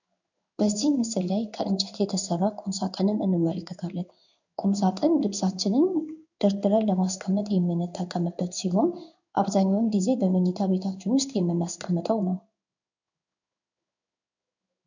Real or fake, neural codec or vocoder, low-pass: fake; codec, 16 kHz in and 24 kHz out, 1 kbps, XY-Tokenizer; 7.2 kHz